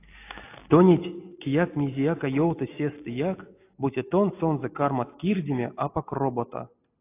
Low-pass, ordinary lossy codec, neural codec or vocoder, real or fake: 3.6 kHz; AAC, 24 kbps; none; real